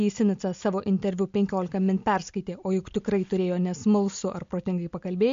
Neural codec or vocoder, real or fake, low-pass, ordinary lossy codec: none; real; 7.2 kHz; MP3, 48 kbps